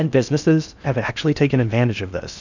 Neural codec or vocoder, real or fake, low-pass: codec, 16 kHz in and 24 kHz out, 0.6 kbps, FocalCodec, streaming, 4096 codes; fake; 7.2 kHz